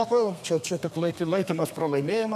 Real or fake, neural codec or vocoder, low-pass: fake; codec, 32 kHz, 1.9 kbps, SNAC; 14.4 kHz